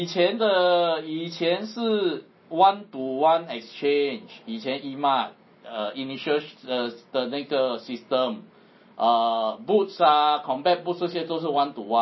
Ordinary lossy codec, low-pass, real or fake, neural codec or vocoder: MP3, 24 kbps; 7.2 kHz; real; none